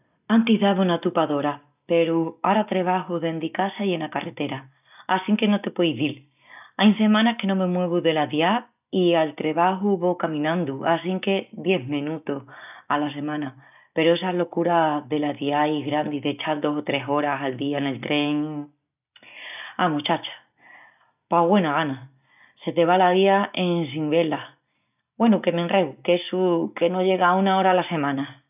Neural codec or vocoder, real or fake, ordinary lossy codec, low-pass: none; real; none; 3.6 kHz